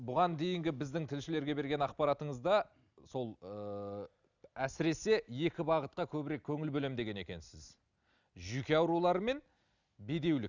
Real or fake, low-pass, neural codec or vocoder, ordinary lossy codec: real; 7.2 kHz; none; none